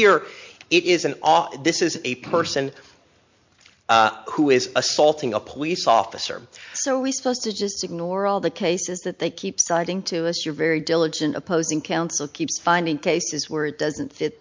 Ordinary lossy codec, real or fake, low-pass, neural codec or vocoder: MP3, 48 kbps; real; 7.2 kHz; none